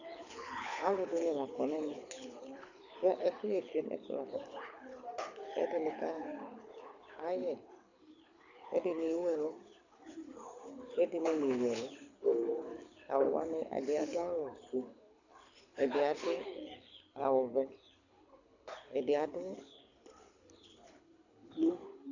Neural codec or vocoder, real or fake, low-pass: codec, 24 kHz, 6 kbps, HILCodec; fake; 7.2 kHz